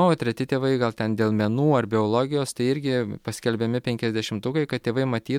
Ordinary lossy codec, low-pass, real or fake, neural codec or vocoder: MP3, 96 kbps; 19.8 kHz; real; none